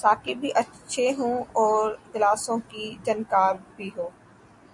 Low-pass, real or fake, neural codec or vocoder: 10.8 kHz; real; none